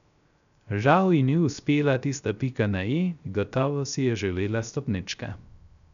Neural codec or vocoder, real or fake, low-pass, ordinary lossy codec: codec, 16 kHz, 0.3 kbps, FocalCodec; fake; 7.2 kHz; none